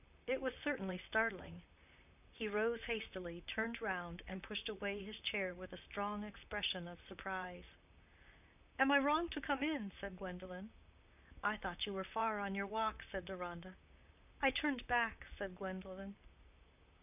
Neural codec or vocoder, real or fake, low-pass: vocoder, 44.1 kHz, 128 mel bands, Pupu-Vocoder; fake; 3.6 kHz